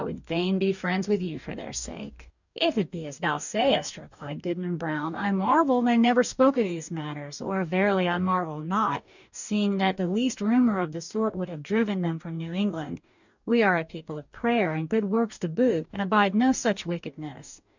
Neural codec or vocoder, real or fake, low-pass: codec, 44.1 kHz, 2.6 kbps, DAC; fake; 7.2 kHz